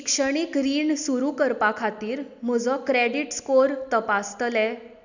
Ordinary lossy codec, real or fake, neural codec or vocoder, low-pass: none; real; none; 7.2 kHz